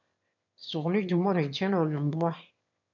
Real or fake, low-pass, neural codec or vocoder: fake; 7.2 kHz; autoencoder, 22.05 kHz, a latent of 192 numbers a frame, VITS, trained on one speaker